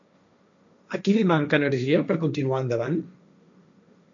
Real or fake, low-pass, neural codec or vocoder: fake; 7.2 kHz; codec, 16 kHz, 1.1 kbps, Voila-Tokenizer